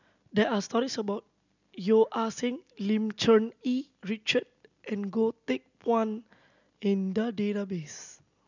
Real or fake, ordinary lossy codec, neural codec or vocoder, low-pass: real; none; none; 7.2 kHz